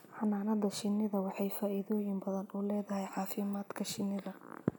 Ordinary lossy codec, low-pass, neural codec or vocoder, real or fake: none; none; none; real